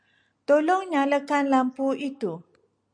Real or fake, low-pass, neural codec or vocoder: real; 9.9 kHz; none